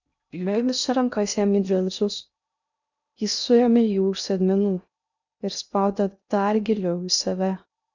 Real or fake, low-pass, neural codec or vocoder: fake; 7.2 kHz; codec, 16 kHz in and 24 kHz out, 0.6 kbps, FocalCodec, streaming, 2048 codes